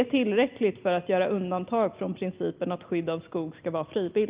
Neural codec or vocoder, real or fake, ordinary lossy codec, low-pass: none; real; Opus, 32 kbps; 3.6 kHz